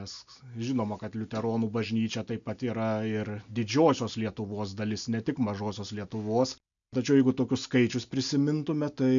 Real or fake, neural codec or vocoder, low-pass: real; none; 7.2 kHz